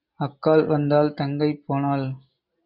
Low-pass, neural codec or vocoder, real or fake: 5.4 kHz; none; real